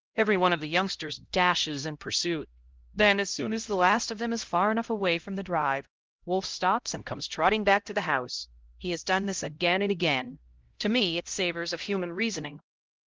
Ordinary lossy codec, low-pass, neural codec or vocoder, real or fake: Opus, 16 kbps; 7.2 kHz; codec, 16 kHz, 0.5 kbps, X-Codec, HuBERT features, trained on LibriSpeech; fake